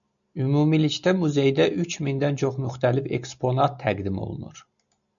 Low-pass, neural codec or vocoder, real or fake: 7.2 kHz; none; real